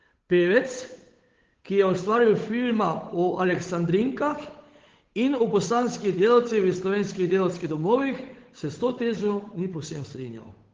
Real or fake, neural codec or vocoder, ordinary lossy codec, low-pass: fake; codec, 16 kHz, 8 kbps, FunCodec, trained on Chinese and English, 25 frames a second; Opus, 16 kbps; 7.2 kHz